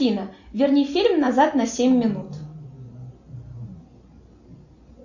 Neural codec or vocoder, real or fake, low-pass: none; real; 7.2 kHz